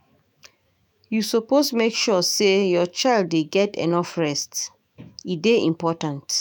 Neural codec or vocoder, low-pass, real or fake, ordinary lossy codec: autoencoder, 48 kHz, 128 numbers a frame, DAC-VAE, trained on Japanese speech; none; fake; none